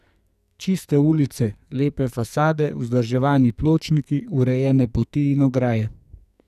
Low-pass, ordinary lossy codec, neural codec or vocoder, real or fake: 14.4 kHz; none; codec, 44.1 kHz, 2.6 kbps, SNAC; fake